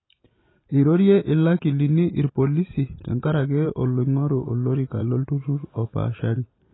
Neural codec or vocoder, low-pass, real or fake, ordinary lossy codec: vocoder, 44.1 kHz, 128 mel bands every 512 samples, BigVGAN v2; 7.2 kHz; fake; AAC, 16 kbps